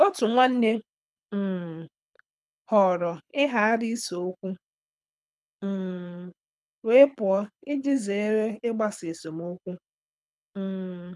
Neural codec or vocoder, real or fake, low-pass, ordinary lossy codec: codec, 24 kHz, 6 kbps, HILCodec; fake; none; none